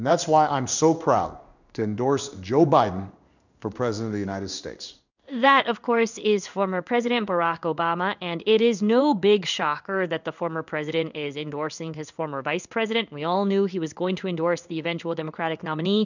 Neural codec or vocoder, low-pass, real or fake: codec, 16 kHz in and 24 kHz out, 1 kbps, XY-Tokenizer; 7.2 kHz; fake